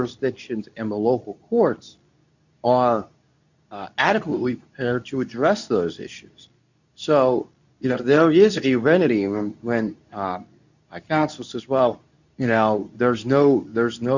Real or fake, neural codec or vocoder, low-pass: fake; codec, 24 kHz, 0.9 kbps, WavTokenizer, medium speech release version 2; 7.2 kHz